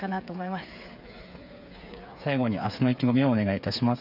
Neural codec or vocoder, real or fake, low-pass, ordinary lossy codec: codec, 16 kHz, 8 kbps, FreqCodec, smaller model; fake; 5.4 kHz; none